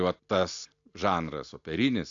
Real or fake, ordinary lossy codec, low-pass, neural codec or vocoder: real; AAC, 64 kbps; 7.2 kHz; none